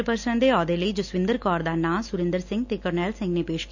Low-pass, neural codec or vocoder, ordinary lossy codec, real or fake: 7.2 kHz; none; none; real